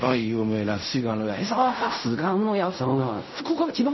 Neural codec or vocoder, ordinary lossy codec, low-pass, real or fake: codec, 16 kHz in and 24 kHz out, 0.4 kbps, LongCat-Audio-Codec, fine tuned four codebook decoder; MP3, 24 kbps; 7.2 kHz; fake